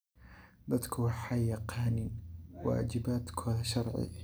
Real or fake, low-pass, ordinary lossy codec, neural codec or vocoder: real; none; none; none